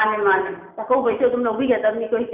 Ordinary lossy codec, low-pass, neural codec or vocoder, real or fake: Opus, 64 kbps; 3.6 kHz; none; real